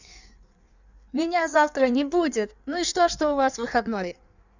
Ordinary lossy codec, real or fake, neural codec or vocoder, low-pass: none; fake; codec, 16 kHz in and 24 kHz out, 1.1 kbps, FireRedTTS-2 codec; 7.2 kHz